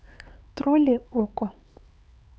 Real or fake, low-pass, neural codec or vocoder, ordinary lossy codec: fake; none; codec, 16 kHz, 4 kbps, X-Codec, HuBERT features, trained on LibriSpeech; none